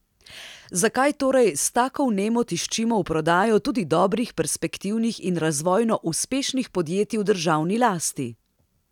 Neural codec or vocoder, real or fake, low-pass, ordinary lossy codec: none; real; 19.8 kHz; none